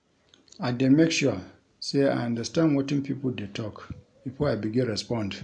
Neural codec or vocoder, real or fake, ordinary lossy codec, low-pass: none; real; none; 9.9 kHz